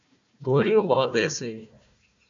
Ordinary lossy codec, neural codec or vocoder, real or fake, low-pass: AAC, 64 kbps; codec, 16 kHz, 1 kbps, FunCodec, trained on Chinese and English, 50 frames a second; fake; 7.2 kHz